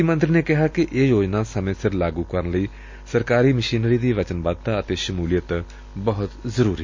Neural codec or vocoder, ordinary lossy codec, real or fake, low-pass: none; MP3, 48 kbps; real; 7.2 kHz